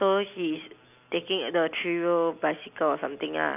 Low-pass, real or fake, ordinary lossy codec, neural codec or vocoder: 3.6 kHz; real; none; none